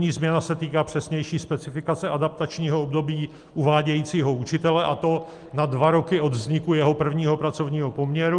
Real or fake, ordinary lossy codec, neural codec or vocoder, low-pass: real; Opus, 24 kbps; none; 10.8 kHz